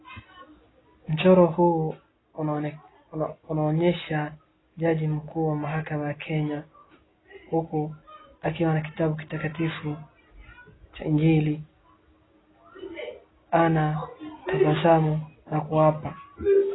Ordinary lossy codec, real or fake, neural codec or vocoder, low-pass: AAC, 16 kbps; real; none; 7.2 kHz